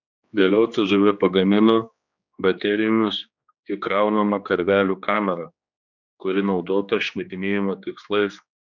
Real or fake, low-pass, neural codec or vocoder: fake; 7.2 kHz; codec, 16 kHz, 2 kbps, X-Codec, HuBERT features, trained on general audio